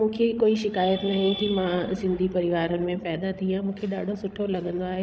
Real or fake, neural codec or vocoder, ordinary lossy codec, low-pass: fake; codec, 16 kHz, 16 kbps, FreqCodec, larger model; none; none